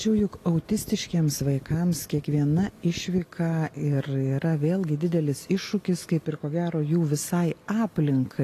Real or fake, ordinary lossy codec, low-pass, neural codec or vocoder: real; AAC, 48 kbps; 14.4 kHz; none